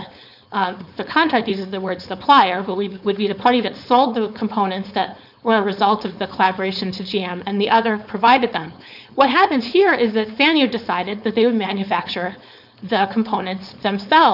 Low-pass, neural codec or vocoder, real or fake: 5.4 kHz; codec, 16 kHz, 4.8 kbps, FACodec; fake